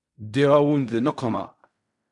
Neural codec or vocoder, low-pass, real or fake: codec, 16 kHz in and 24 kHz out, 0.4 kbps, LongCat-Audio-Codec, fine tuned four codebook decoder; 10.8 kHz; fake